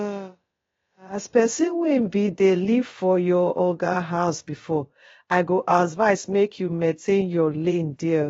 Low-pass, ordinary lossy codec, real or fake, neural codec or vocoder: 7.2 kHz; AAC, 24 kbps; fake; codec, 16 kHz, about 1 kbps, DyCAST, with the encoder's durations